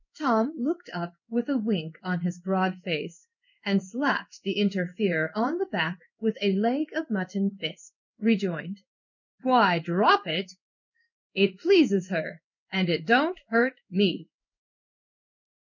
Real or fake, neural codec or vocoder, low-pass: fake; codec, 16 kHz in and 24 kHz out, 1 kbps, XY-Tokenizer; 7.2 kHz